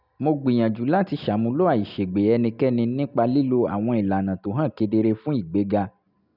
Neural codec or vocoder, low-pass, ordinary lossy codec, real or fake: none; 5.4 kHz; none; real